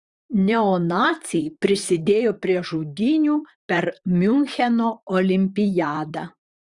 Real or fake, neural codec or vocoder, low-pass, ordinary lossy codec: fake; vocoder, 44.1 kHz, 128 mel bands, Pupu-Vocoder; 10.8 kHz; Opus, 64 kbps